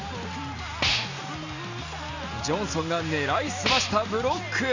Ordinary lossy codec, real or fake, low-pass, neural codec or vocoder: none; real; 7.2 kHz; none